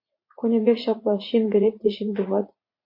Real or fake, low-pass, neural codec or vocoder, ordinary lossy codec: fake; 5.4 kHz; vocoder, 44.1 kHz, 80 mel bands, Vocos; MP3, 32 kbps